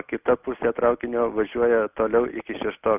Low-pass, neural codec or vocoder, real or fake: 3.6 kHz; none; real